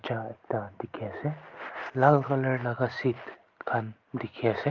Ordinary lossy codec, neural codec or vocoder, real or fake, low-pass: Opus, 32 kbps; none; real; 7.2 kHz